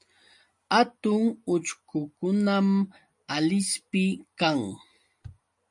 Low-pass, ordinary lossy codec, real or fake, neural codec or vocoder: 10.8 kHz; MP3, 64 kbps; real; none